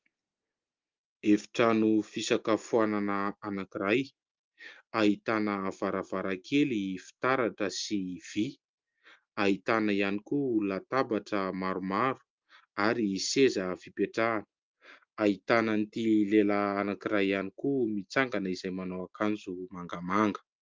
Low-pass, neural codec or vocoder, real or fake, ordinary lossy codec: 7.2 kHz; none; real; Opus, 24 kbps